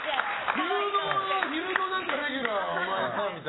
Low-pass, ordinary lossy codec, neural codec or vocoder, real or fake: 7.2 kHz; AAC, 16 kbps; none; real